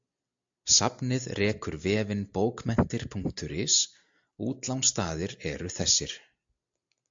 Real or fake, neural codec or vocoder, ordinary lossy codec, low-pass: real; none; AAC, 64 kbps; 7.2 kHz